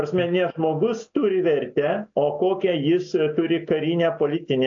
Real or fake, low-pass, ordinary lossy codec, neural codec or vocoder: real; 7.2 kHz; AAC, 48 kbps; none